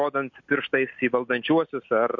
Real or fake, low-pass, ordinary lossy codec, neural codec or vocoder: real; 7.2 kHz; MP3, 48 kbps; none